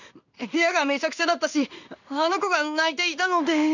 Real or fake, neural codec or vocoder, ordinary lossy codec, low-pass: fake; codec, 16 kHz in and 24 kHz out, 1 kbps, XY-Tokenizer; none; 7.2 kHz